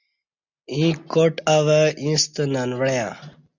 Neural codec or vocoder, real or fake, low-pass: none; real; 7.2 kHz